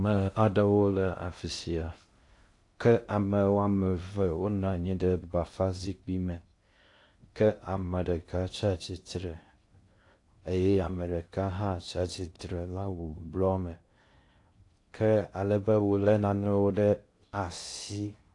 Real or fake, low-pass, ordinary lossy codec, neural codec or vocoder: fake; 10.8 kHz; AAC, 48 kbps; codec, 16 kHz in and 24 kHz out, 0.6 kbps, FocalCodec, streaming, 2048 codes